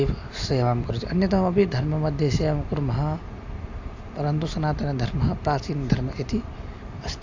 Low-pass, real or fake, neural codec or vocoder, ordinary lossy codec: 7.2 kHz; real; none; AAC, 48 kbps